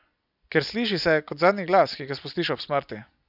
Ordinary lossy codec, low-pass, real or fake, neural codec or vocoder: none; 5.4 kHz; real; none